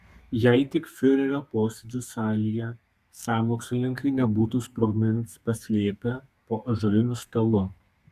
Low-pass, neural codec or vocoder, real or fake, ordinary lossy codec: 14.4 kHz; codec, 32 kHz, 1.9 kbps, SNAC; fake; Opus, 64 kbps